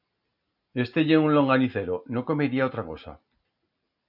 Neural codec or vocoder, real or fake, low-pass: none; real; 5.4 kHz